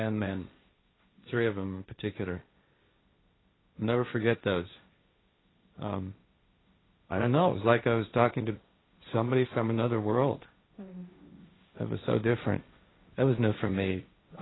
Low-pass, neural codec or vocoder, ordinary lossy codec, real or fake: 7.2 kHz; codec, 16 kHz, 1.1 kbps, Voila-Tokenizer; AAC, 16 kbps; fake